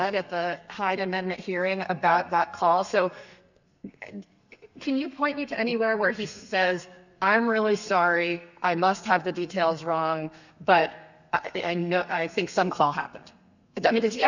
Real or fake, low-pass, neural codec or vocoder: fake; 7.2 kHz; codec, 32 kHz, 1.9 kbps, SNAC